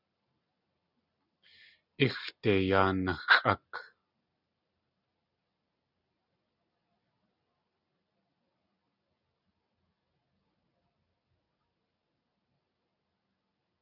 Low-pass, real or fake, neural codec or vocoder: 5.4 kHz; real; none